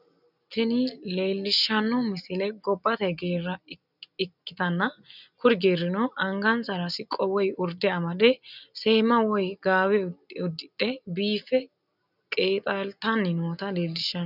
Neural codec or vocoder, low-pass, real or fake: none; 5.4 kHz; real